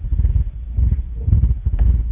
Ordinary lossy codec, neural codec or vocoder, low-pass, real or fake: none; codec, 16 kHz, 1.1 kbps, Voila-Tokenizer; 3.6 kHz; fake